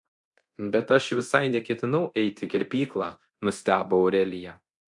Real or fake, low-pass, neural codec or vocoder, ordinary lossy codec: fake; 10.8 kHz; codec, 24 kHz, 0.9 kbps, DualCodec; MP3, 64 kbps